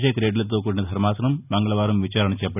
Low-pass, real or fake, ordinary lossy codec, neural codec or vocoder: 3.6 kHz; real; none; none